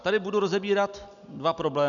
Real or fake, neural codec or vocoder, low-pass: real; none; 7.2 kHz